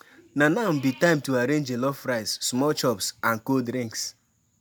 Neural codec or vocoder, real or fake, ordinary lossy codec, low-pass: none; real; none; none